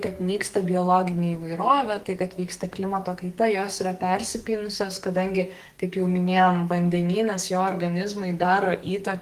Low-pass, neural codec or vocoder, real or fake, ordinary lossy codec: 14.4 kHz; codec, 44.1 kHz, 2.6 kbps, SNAC; fake; Opus, 24 kbps